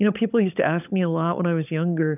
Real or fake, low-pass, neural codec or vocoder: fake; 3.6 kHz; codec, 16 kHz, 16 kbps, FunCodec, trained on LibriTTS, 50 frames a second